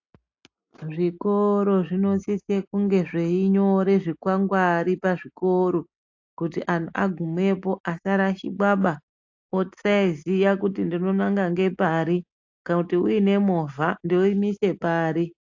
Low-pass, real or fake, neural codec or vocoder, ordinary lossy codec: 7.2 kHz; real; none; AAC, 48 kbps